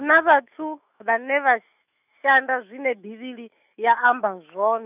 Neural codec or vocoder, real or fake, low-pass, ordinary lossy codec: autoencoder, 48 kHz, 128 numbers a frame, DAC-VAE, trained on Japanese speech; fake; 3.6 kHz; none